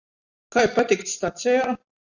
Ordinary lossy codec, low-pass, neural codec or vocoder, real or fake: Opus, 64 kbps; 7.2 kHz; none; real